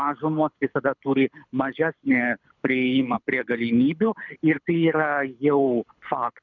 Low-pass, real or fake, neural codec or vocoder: 7.2 kHz; fake; codec, 24 kHz, 6 kbps, HILCodec